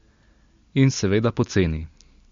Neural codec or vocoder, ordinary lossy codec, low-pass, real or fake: none; MP3, 48 kbps; 7.2 kHz; real